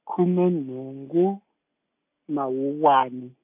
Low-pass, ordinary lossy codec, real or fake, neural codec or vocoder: 3.6 kHz; AAC, 32 kbps; real; none